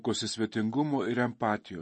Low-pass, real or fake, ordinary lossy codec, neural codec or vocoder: 10.8 kHz; real; MP3, 32 kbps; none